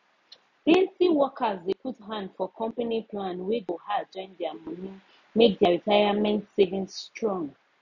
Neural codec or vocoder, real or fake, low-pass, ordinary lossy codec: none; real; 7.2 kHz; none